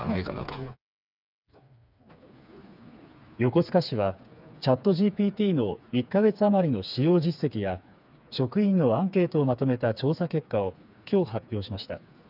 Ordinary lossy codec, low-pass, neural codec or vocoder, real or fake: none; 5.4 kHz; codec, 16 kHz, 4 kbps, FreqCodec, smaller model; fake